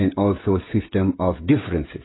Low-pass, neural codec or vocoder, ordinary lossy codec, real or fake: 7.2 kHz; codec, 16 kHz, 16 kbps, FreqCodec, smaller model; AAC, 16 kbps; fake